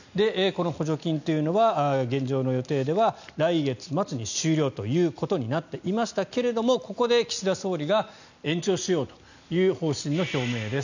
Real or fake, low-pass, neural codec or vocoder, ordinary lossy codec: real; 7.2 kHz; none; none